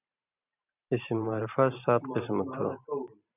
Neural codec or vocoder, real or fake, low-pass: vocoder, 44.1 kHz, 128 mel bands every 512 samples, BigVGAN v2; fake; 3.6 kHz